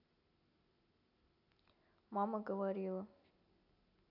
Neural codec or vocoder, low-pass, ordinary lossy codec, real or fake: none; 5.4 kHz; AAC, 48 kbps; real